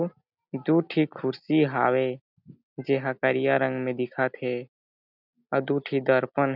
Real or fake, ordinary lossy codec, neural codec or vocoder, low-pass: real; none; none; 5.4 kHz